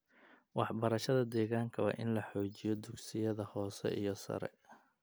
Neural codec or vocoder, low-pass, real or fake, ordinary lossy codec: none; none; real; none